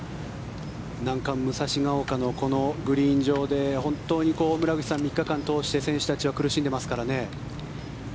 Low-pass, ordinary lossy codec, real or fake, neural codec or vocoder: none; none; real; none